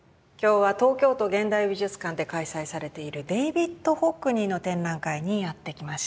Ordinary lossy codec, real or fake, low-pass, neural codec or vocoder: none; real; none; none